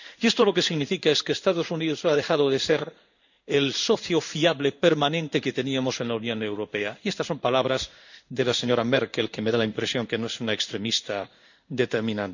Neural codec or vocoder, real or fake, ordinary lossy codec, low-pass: codec, 16 kHz in and 24 kHz out, 1 kbps, XY-Tokenizer; fake; none; 7.2 kHz